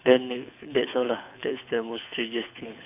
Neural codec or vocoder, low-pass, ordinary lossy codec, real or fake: codec, 24 kHz, 6 kbps, HILCodec; 3.6 kHz; AAC, 32 kbps; fake